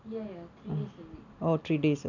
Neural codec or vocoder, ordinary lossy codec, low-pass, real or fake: none; none; 7.2 kHz; real